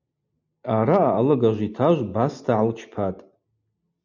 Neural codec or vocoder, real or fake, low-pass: none; real; 7.2 kHz